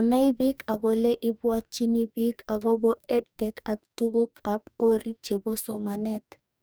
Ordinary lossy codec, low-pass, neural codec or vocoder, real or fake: none; none; codec, 44.1 kHz, 2.6 kbps, DAC; fake